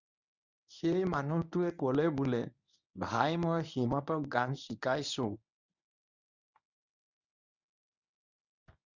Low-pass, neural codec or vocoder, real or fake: 7.2 kHz; codec, 24 kHz, 0.9 kbps, WavTokenizer, medium speech release version 1; fake